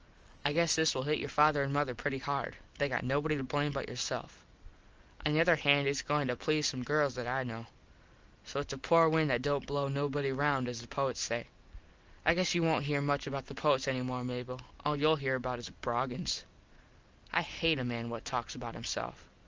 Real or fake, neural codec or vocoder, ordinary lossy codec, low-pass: real; none; Opus, 24 kbps; 7.2 kHz